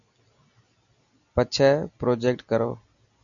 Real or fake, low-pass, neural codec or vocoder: real; 7.2 kHz; none